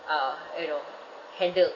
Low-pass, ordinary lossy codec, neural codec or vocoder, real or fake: 7.2 kHz; none; none; real